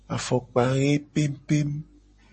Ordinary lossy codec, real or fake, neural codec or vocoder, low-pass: MP3, 32 kbps; real; none; 9.9 kHz